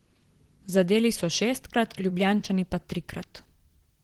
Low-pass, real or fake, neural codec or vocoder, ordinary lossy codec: 19.8 kHz; fake; vocoder, 44.1 kHz, 128 mel bands, Pupu-Vocoder; Opus, 16 kbps